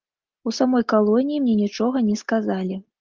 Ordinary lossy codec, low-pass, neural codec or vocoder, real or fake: Opus, 32 kbps; 7.2 kHz; none; real